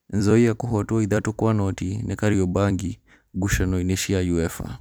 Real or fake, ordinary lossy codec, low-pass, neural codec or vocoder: fake; none; none; vocoder, 44.1 kHz, 128 mel bands every 256 samples, BigVGAN v2